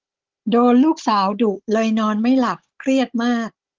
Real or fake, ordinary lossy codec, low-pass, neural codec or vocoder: fake; Opus, 16 kbps; 7.2 kHz; codec, 16 kHz, 16 kbps, FunCodec, trained on Chinese and English, 50 frames a second